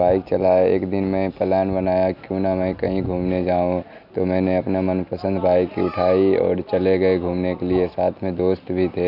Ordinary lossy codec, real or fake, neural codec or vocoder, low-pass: none; real; none; 5.4 kHz